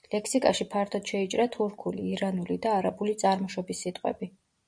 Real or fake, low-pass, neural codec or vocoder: real; 9.9 kHz; none